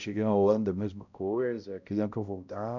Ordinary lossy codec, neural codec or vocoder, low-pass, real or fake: none; codec, 16 kHz, 0.5 kbps, X-Codec, HuBERT features, trained on balanced general audio; 7.2 kHz; fake